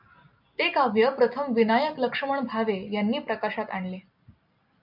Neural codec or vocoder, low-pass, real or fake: none; 5.4 kHz; real